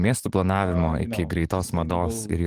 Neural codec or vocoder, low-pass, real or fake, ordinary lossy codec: codec, 44.1 kHz, 7.8 kbps, DAC; 14.4 kHz; fake; Opus, 24 kbps